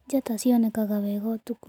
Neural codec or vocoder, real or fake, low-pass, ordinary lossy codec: none; real; 19.8 kHz; none